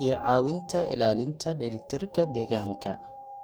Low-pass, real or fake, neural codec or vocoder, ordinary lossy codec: none; fake; codec, 44.1 kHz, 2.6 kbps, DAC; none